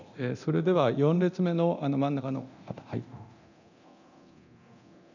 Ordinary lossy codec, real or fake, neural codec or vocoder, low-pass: none; fake; codec, 24 kHz, 0.9 kbps, DualCodec; 7.2 kHz